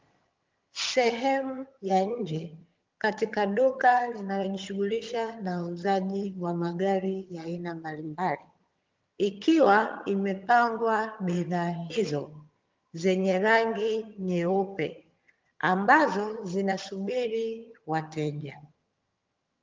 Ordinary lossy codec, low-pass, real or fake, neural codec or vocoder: Opus, 32 kbps; 7.2 kHz; fake; vocoder, 22.05 kHz, 80 mel bands, HiFi-GAN